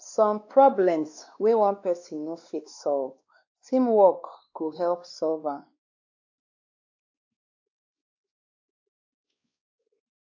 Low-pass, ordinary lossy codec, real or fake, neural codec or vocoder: 7.2 kHz; AAC, 48 kbps; fake; codec, 16 kHz, 2 kbps, X-Codec, WavLM features, trained on Multilingual LibriSpeech